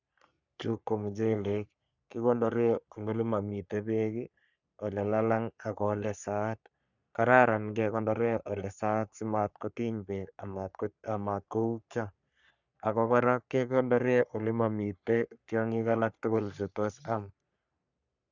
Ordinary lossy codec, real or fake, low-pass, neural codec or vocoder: none; fake; 7.2 kHz; codec, 44.1 kHz, 3.4 kbps, Pupu-Codec